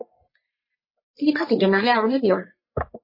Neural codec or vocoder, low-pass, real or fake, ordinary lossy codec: codec, 44.1 kHz, 1.7 kbps, Pupu-Codec; 5.4 kHz; fake; MP3, 24 kbps